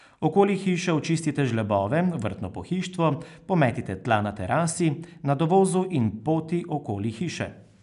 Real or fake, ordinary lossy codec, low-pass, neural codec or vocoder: real; none; 10.8 kHz; none